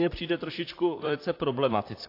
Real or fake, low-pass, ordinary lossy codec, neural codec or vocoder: fake; 5.4 kHz; AAC, 32 kbps; vocoder, 44.1 kHz, 128 mel bands, Pupu-Vocoder